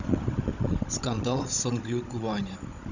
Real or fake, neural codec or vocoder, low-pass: fake; codec, 16 kHz, 16 kbps, FunCodec, trained on Chinese and English, 50 frames a second; 7.2 kHz